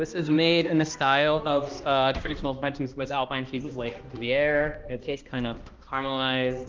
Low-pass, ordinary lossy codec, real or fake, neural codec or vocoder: 7.2 kHz; Opus, 24 kbps; fake; codec, 16 kHz, 1 kbps, X-Codec, HuBERT features, trained on balanced general audio